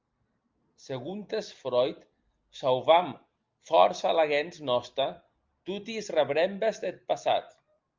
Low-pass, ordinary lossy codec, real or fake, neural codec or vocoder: 7.2 kHz; Opus, 24 kbps; real; none